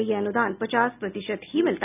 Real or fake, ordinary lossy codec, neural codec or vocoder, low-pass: real; none; none; 3.6 kHz